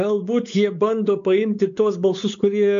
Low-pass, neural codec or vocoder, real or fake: 7.2 kHz; codec, 16 kHz, 4 kbps, X-Codec, WavLM features, trained on Multilingual LibriSpeech; fake